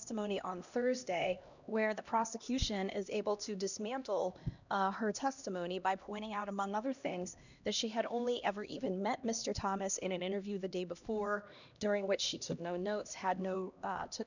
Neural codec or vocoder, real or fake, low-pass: codec, 16 kHz, 1 kbps, X-Codec, HuBERT features, trained on LibriSpeech; fake; 7.2 kHz